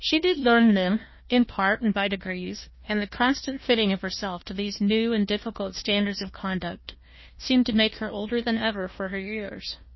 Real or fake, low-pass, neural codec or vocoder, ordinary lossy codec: fake; 7.2 kHz; codec, 16 kHz, 1 kbps, FunCodec, trained on Chinese and English, 50 frames a second; MP3, 24 kbps